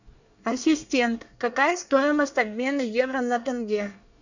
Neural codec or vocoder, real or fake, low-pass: codec, 24 kHz, 1 kbps, SNAC; fake; 7.2 kHz